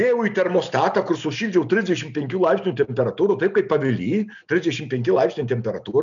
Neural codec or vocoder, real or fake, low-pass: none; real; 7.2 kHz